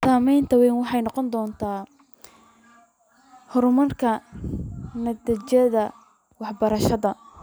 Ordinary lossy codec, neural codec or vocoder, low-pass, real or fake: none; none; none; real